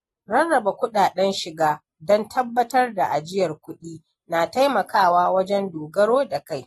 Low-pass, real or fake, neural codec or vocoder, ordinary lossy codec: 19.8 kHz; real; none; AAC, 32 kbps